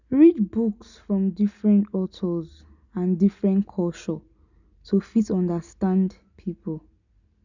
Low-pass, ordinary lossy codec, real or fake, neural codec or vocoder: 7.2 kHz; none; real; none